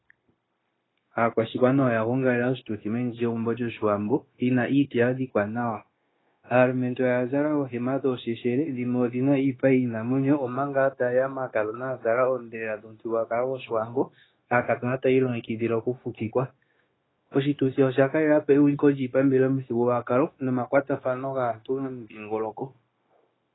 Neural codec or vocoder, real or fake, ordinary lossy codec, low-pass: codec, 16 kHz, 0.9 kbps, LongCat-Audio-Codec; fake; AAC, 16 kbps; 7.2 kHz